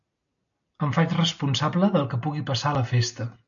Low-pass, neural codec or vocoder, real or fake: 7.2 kHz; none; real